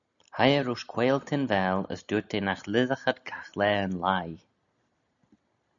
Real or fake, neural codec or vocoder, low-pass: real; none; 7.2 kHz